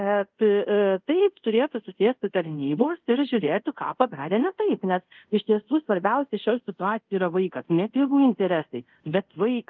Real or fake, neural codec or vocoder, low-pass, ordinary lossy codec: fake; codec, 24 kHz, 0.5 kbps, DualCodec; 7.2 kHz; Opus, 24 kbps